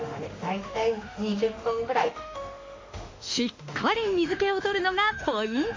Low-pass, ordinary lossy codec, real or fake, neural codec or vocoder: 7.2 kHz; MP3, 48 kbps; fake; autoencoder, 48 kHz, 32 numbers a frame, DAC-VAE, trained on Japanese speech